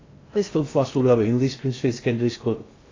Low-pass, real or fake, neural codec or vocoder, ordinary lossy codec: 7.2 kHz; fake; codec, 16 kHz in and 24 kHz out, 0.6 kbps, FocalCodec, streaming, 2048 codes; AAC, 32 kbps